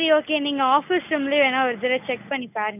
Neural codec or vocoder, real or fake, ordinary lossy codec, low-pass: none; real; none; 3.6 kHz